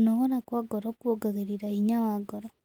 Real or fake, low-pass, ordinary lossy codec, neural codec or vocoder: real; 19.8 kHz; Opus, 24 kbps; none